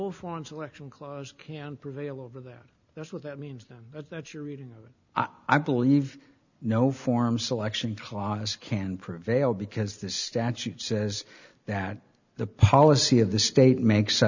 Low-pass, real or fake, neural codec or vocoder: 7.2 kHz; real; none